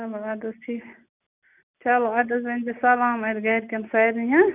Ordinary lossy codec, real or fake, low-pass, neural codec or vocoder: none; real; 3.6 kHz; none